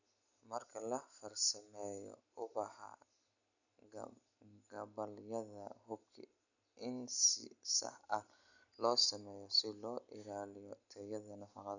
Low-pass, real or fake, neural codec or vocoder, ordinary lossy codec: 7.2 kHz; real; none; none